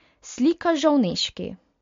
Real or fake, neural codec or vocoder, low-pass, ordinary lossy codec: real; none; 7.2 kHz; MP3, 48 kbps